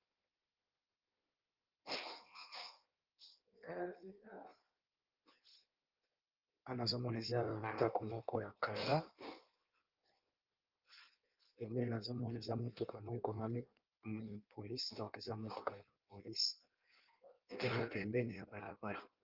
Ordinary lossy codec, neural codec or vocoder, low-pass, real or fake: Opus, 24 kbps; codec, 16 kHz in and 24 kHz out, 1.1 kbps, FireRedTTS-2 codec; 5.4 kHz; fake